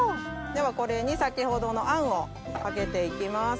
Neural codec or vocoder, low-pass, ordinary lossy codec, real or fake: none; none; none; real